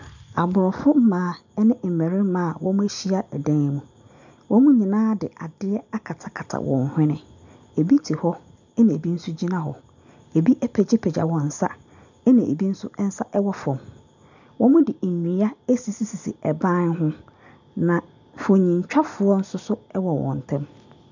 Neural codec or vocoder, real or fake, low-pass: none; real; 7.2 kHz